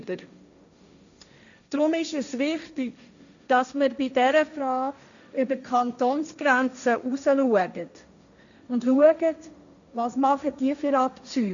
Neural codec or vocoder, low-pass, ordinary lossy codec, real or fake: codec, 16 kHz, 1.1 kbps, Voila-Tokenizer; 7.2 kHz; none; fake